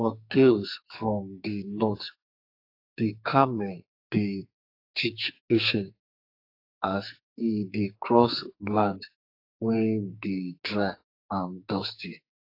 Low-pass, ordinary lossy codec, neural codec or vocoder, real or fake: 5.4 kHz; AAC, 32 kbps; codec, 44.1 kHz, 2.6 kbps, SNAC; fake